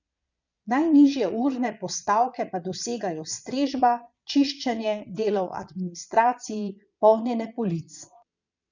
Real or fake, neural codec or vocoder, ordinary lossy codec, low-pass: fake; vocoder, 22.05 kHz, 80 mel bands, Vocos; none; 7.2 kHz